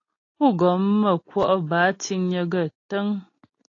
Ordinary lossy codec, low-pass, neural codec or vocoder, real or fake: AAC, 32 kbps; 7.2 kHz; none; real